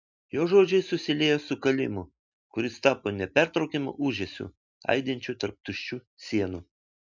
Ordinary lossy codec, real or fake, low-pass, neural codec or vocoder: MP3, 64 kbps; real; 7.2 kHz; none